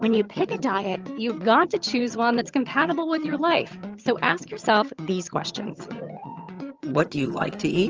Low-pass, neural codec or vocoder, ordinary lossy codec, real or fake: 7.2 kHz; vocoder, 22.05 kHz, 80 mel bands, HiFi-GAN; Opus, 24 kbps; fake